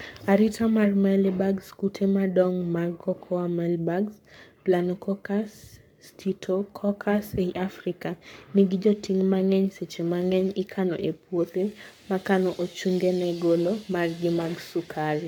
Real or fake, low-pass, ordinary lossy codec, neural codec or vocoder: fake; 19.8 kHz; MP3, 96 kbps; codec, 44.1 kHz, 7.8 kbps, Pupu-Codec